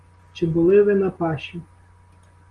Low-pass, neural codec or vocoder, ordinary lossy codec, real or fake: 10.8 kHz; none; Opus, 24 kbps; real